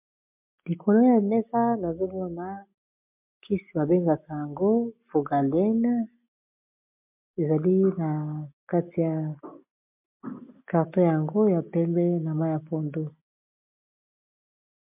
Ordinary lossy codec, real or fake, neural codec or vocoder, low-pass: MP3, 32 kbps; real; none; 3.6 kHz